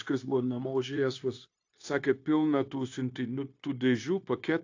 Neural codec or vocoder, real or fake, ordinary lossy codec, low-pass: codec, 16 kHz, 0.9 kbps, LongCat-Audio-Codec; fake; AAC, 48 kbps; 7.2 kHz